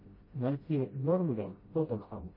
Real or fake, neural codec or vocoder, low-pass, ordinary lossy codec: fake; codec, 16 kHz, 0.5 kbps, FreqCodec, smaller model; 5.4 kHz; MP3, 24 kbps